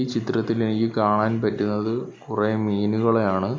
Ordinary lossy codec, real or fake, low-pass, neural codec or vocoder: none; real; none; none